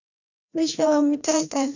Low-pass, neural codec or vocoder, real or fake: 7.2 kHz; codec, 16 kHz, 1 kbps, FreqCodec, larger model; fake